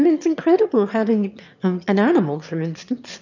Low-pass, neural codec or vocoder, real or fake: 7.2 kHz; autoencoder, 22.05 kHz, a latent of 192 numbers a frame, VITS, trained on one speaker; fake